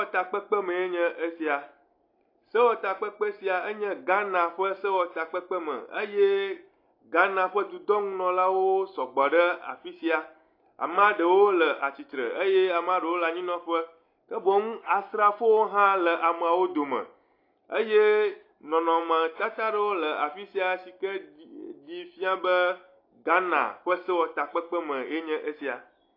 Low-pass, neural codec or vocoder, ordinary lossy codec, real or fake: 5.4 kHz; none; AAC, 32 kbps; real